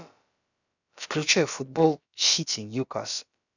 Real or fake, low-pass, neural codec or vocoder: fake; 7.2 kHz; codec, 16 kHz, about 1 kbps, DyCAST, with the encoder's durations